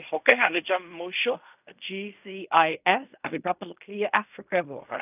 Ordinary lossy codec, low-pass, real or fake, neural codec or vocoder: none; 3.6 kHz; fake; codec, 16 kHz in and 24 kHz out, 0.4 kbps, LongCat-Audio-Codec, fine tuned four codebook decoder